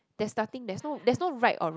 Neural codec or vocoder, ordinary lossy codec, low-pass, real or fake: none; none; none; real